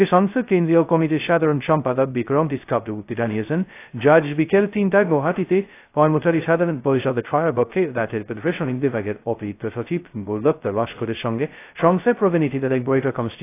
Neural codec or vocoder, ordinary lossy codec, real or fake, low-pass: codec, 16 kHz, 0.2 kbps, FocalCodec; AAC, 24 kbps; fake; 3.6 kHz